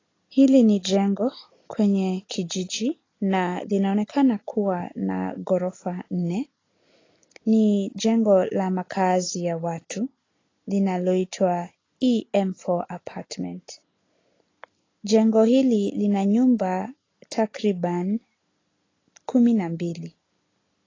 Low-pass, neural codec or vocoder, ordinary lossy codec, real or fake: 7.2 kHz; none; AAC, 32 kbps; real